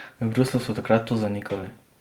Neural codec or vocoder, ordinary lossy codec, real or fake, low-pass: vocoder, 44.1 kHz, 128 mel bands every 256 samples, BigVGAN v2; Opus, 32 kbps; fake; 19.8 kHz